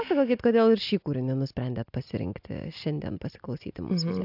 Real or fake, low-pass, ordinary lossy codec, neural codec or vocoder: real; 5.4 kHz; AAC, 48 kbps; none